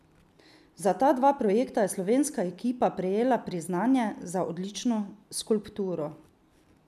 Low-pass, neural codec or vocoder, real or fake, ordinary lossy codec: 14.4 kHz; none; real; none